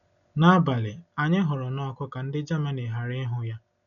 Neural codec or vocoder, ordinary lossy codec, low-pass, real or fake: none; none; 7.2 kHz; real